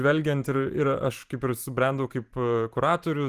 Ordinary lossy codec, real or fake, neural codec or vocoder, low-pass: Opus, 24 kbps; fake; vocoder, 44.1 kHz, 128 mel bands every 512 samples, BigVGAN v2; 14.4 kHz